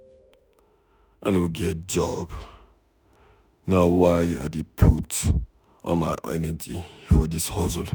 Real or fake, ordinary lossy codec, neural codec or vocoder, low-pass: fake; none; autoencoder, 48 kHz, 32 numbers a frame, DAC-VAE, trained on Japanese speech; none